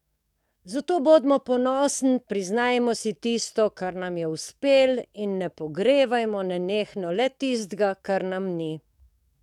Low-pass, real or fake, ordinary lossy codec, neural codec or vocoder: 19.8 kHz; fake; none; codec, 44.1 kHz, 7.8 kbps, DAC